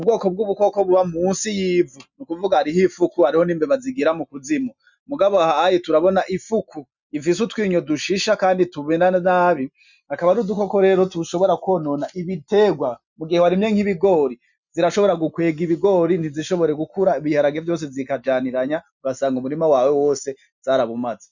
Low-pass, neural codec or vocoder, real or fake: 7.2 kHz; none; real